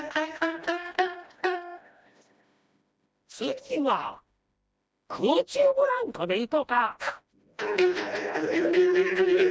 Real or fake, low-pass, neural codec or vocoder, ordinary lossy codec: fake; none; codec, 16 kHz, 1 kbps, FreqCodec, smaller model; none